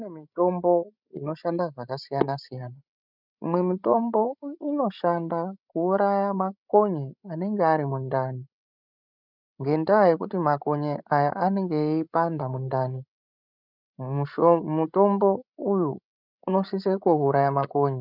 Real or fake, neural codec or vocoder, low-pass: fake; codec, 16 kHz, 16 kbps, FreqCodec, larger model; 5.4 kHz